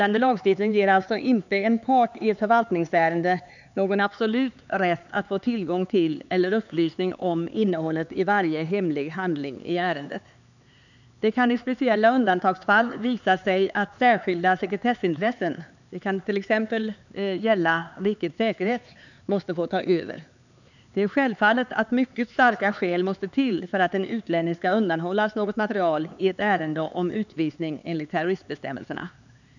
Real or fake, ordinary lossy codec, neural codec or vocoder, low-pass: fake; none; codec, 16 kHz, 4 kbps, X-Codec, HuBERT features, trained on LibriSpeech; 7.2 kHz